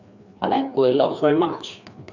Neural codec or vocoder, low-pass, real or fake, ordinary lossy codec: codec, 16 kHz, 2 kbps, FreqCodec, larger model; 7.2 kHz; fake; Opus, 64 kbps